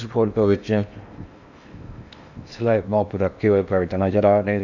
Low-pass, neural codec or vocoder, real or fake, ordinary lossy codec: 7.2 kHz; codec, 16 kHz in and 24 kHz out, 0.8 kbps, FocalCodec, streaming, 65536 codes; fake; none